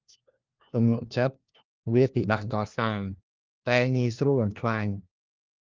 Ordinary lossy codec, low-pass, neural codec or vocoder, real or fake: Opus, 32 kbps; 7.2 kHz; codec, 16 kHz, 1 kbps, FunCodec, trained on LibriTTS, 50 frames a second; fake